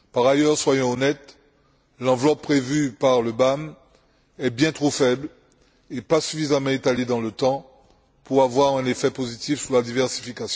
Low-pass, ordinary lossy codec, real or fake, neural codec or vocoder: none; none; real; none